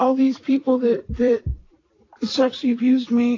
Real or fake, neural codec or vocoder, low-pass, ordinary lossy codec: fake; vocoder, 44.1 kHz, 128 mel bands every 256 samples, BigVGAN v2; 7.2 kHz; AAC, 32 kbps